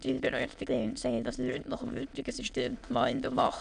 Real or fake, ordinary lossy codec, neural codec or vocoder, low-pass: fake; none; autoencoder, 22.05 kHz, a latent of 192 numbers a frame, VITS, trained on many speakers; 9.9 kHz